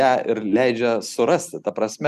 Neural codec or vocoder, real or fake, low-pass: none; real; 14.4 kHz